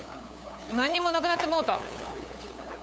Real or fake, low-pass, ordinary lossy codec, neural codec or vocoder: fake; none; none; codec, 16 kHz, 8 kbps, FunCodec, trained on LibriTTS, 25 frames a second